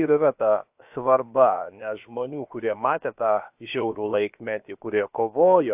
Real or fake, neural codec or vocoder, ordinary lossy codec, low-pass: fake; codec, 16 kHz, about 1 kbps, DyCAST, with the encoder's durations; AAC, 32 kbps; 3.6 kHz